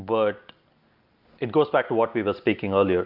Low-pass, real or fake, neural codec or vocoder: 5.4 kHz; real; none